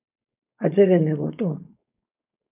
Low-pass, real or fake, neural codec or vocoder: 3.6 kHz; fake; codec, 16 kHz, 4.8 kbps, FACodec